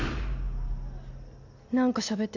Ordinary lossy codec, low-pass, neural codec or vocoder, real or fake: none; 7.2 kHz; none; real